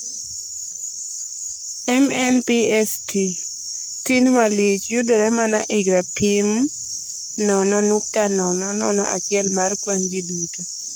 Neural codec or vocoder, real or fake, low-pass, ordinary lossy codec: codec, 44.1 kHz, 3.4 kbps, Pupu-Codec; fake; none; none